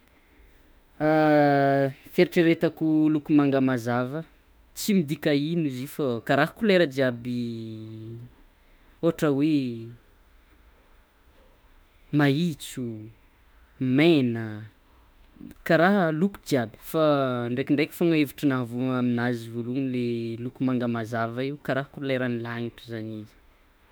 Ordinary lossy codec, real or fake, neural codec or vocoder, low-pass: none; fake; autoencoder, 48 kHz, 32 numbers a frame, DAC-VAE, trained on Japanese speech; none